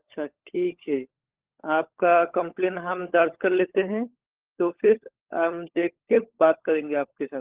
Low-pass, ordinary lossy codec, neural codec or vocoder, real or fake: 3.6 kHz; Opus, 16 kbps; codec, 16 kHz, 16 kbps, FunCodec, trained on LibriTTS, 50 frames a second; fake